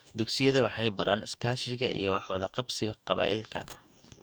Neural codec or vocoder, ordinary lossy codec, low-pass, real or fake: codec, 44.1 kHz, 2.6 kbps, DAC; none; none; fake